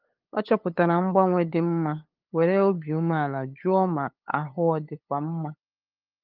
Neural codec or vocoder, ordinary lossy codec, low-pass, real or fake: codec, 16 kHz, 8 kbps, FunCodec, trained on LibriTTS, 25 frames a second; Opus, 32 kbps; 5.4 kHz; fake